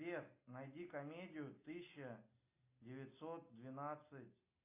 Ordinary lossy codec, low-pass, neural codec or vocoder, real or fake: AAC, 32 kbps; 3.6 kHz; none; real